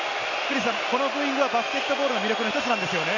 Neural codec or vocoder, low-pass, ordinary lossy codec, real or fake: none; 7.2 kHz; none; real